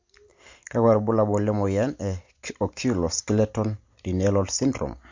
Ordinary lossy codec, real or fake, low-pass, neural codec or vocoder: MP3, 48 kbps; real; 7.2 kHz; none